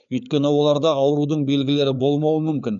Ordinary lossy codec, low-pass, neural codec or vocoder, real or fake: none; 7.2 kHz; codec, 16 kHz, 4 kbps, FreqCodec, larger model; fake